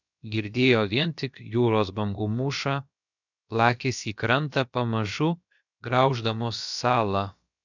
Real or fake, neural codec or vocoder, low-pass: fake; codec, 16 kHz, about 1 kbps, DyCAST, with the encoder's durations; 7.2 kHz